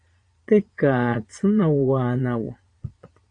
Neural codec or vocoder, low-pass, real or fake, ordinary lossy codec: vocoder, 22.05 kHz, 80 mel bands, Vocos; 9.9 kHz; fake; AAC, 48 kbps